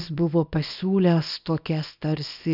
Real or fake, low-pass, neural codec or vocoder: fake; 5.4 kHz; codec, 24 kHz, 0.9 kbps, WavTokenizer, small release